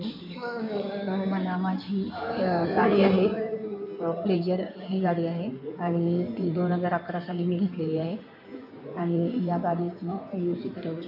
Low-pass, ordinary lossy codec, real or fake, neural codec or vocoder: 5.4 kHz; none; fake; codec, 16 kHz in and 24 kHz out, 2.2 kbps, FireRedTTS-2 codec